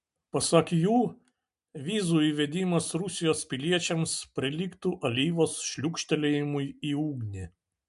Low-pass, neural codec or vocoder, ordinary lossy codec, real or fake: 10.8 kHz; none; MP3, 64 kbps; real